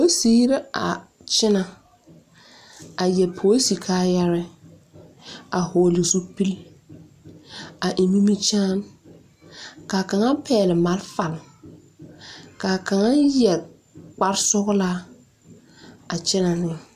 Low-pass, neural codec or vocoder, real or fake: 14.4 kHz; none; real